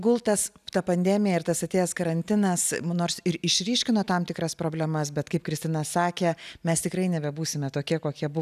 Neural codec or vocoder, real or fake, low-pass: none; real; 14.4 kHz